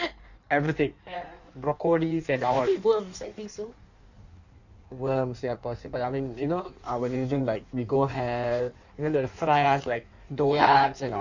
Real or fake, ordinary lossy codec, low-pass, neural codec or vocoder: fake; none; 7.2 kHz; codec, 16 kHz in and 24 kHz out, 1.1 kbps, FireRedTTS-2 codec